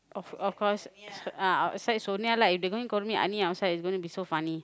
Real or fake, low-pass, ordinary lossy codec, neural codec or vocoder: real; none; none; none